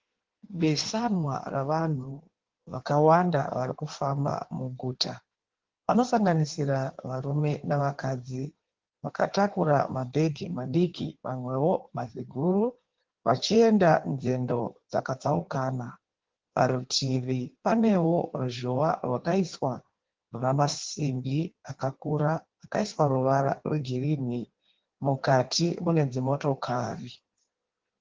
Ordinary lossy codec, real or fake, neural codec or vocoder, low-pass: Opus, 16 kbps; fake; codec, 16 kHz in and 24 kHz out, 1.1 kbps, FireRedTTS-2 codec; 7.2 kHz